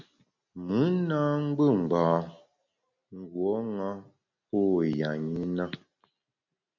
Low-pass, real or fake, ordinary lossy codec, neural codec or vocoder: 7.2 kHz; real; MP3, 48 kbps; none